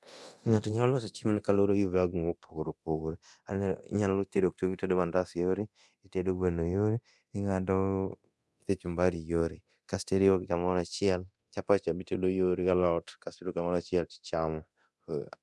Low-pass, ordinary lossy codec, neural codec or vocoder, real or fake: none; none; codec, 24 kHz, 0.9 kbps, DualCodec; fake